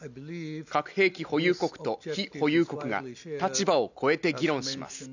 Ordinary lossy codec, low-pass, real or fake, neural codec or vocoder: none; 7.2 kHz; real; none